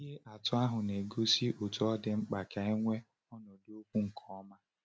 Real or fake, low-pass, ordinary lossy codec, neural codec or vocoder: real; none; none; none